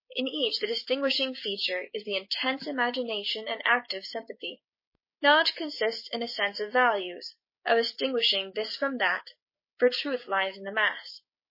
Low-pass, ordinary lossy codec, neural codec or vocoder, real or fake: 5.4 kHz; MP3, 24 kbps; codec, 16 kHz, 6 kbps, DAC; fake